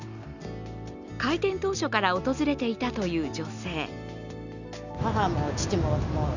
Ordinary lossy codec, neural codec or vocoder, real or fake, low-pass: none; none; real; 7.2 kHz